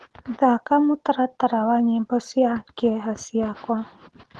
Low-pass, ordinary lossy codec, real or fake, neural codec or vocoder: 10.8 kHz; Opus, 16 kbps; real; none